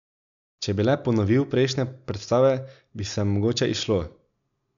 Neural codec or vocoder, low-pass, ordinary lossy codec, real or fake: none; 7.2 kHz; none; real